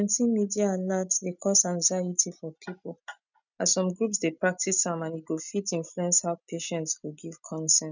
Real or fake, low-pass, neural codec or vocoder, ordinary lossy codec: real; 7.2 kHz; none; none